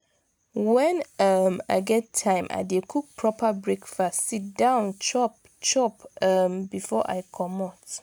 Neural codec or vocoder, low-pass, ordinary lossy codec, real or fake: none; none; none; real